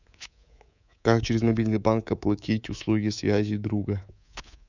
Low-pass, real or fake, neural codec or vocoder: 7.2 kHz; fake; codec, 24 kHz, 3.1 kbps, DualCodec